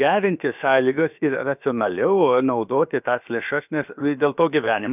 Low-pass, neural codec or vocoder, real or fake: 3.6 kHz; codec, 16 kHz, about 1 kbps, DyCAST, with the encoder's durations; fake